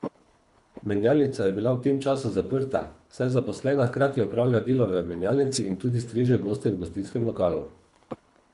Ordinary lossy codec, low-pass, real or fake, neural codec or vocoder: none; 10.8 kHz; fake; codec, 24 kHz, 3 kbps, HILCodec